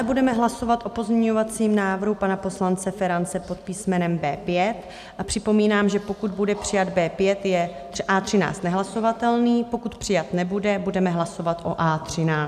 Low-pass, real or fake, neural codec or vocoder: 14.4 kHz; real; none